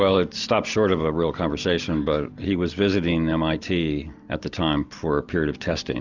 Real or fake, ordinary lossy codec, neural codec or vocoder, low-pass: real; Opus, 64 kbps; none; 7.2 kHz